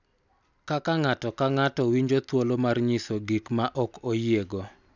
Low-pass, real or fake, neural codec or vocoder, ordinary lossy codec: 7.2 kHz; real; none; none